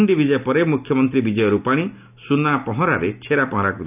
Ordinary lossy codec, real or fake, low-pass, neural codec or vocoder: none; real; 3.6 kHz; none